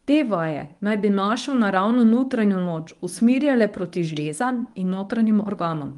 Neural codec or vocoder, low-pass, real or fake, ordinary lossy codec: codec, 24 kHz, 0.9 kbps, WavTokenizer, medium speech release version 1; 10.8 kHz; fake; Opus, 32 kbps